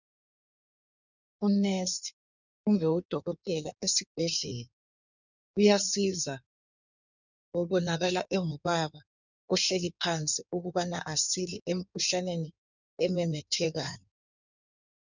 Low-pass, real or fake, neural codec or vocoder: 7.2 kHz; fake; codec, 16 kHz in and 24 kHz out, 1.1 kbps, FireRedTTS-2 codec